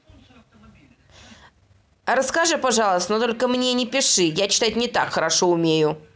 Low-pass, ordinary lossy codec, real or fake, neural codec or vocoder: none; none; real; none